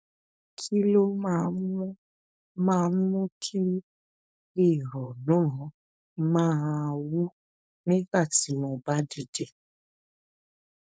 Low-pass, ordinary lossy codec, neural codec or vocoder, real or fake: none; none; codec, 16 kHz, 4.8 kbps, FACodec; fake